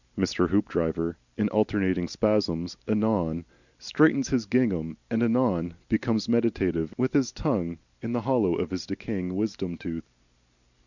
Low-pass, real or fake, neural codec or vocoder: 7.2 kHz; real; none